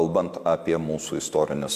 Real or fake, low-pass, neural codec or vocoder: real; 14.4 kHz; none